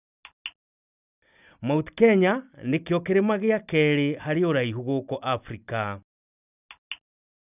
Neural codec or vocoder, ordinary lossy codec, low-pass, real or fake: none; none; 3.6 kHz; real